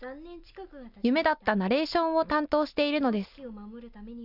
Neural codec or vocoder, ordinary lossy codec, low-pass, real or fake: none; none; 5.4 kHz; real